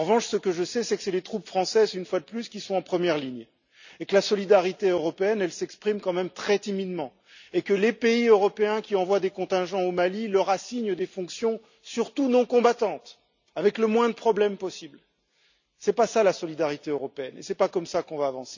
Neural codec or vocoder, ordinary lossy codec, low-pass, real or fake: none; none; 7.2 kHz; real